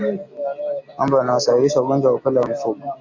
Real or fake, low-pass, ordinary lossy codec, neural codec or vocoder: real; 7.2 kHz; AAC, 48 kbps; none